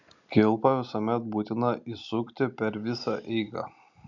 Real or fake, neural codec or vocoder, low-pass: fake; vocoder, 44.1 kHz, 128 mel bands every 256 samples, BigVGAN v2; 7.2 kHz